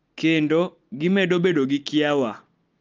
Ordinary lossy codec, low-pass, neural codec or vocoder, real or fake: Opus, 24 kbps; 7.2 kHz; none; real